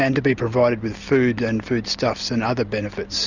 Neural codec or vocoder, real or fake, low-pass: none; real; 7.2 kHz